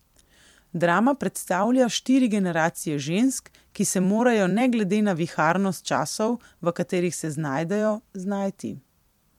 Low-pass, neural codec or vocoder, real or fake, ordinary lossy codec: 19.8 kHz; vocoder, 44.1 kHz, 128 mel bands every 256 samples, BigVGAN v2; fake; MP3, 96 kbps